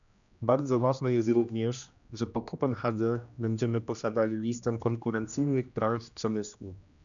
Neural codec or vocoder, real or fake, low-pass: codec, 16 kHz, 1 kbps, X-Codec, HuBERT features, trained on general audio; fake; 7.2 kHz